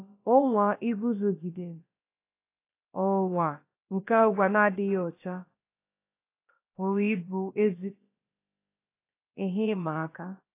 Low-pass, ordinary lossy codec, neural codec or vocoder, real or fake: 3.6 kHz; AAC, 24 kbps; codec, 16 kHz, about 1 kbps, DyCAST, with the encoder's durations; fake